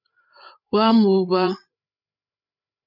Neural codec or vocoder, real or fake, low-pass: codec, 16 kHz, 8 kbps, FreqCodec, larger model; fake; 5.4 kHz